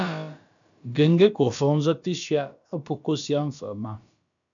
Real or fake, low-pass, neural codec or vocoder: fake; 7.2 kHz; codec, 16 kHz, about 1 kbps, DyCAST, with the encoder's durations